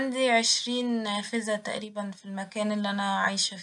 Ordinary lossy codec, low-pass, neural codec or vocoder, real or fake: none; 10.8 kHz; none; real